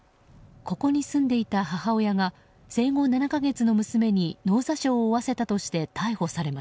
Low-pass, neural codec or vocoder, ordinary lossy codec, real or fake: none; none; none; real